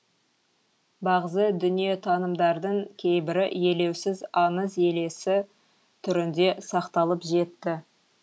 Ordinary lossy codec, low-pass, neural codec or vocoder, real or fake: none; none; none; real